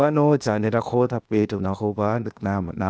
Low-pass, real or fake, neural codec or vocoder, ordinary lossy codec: none; fake; codec, 16 kHz, 0.8 kbps, ZipCodec; none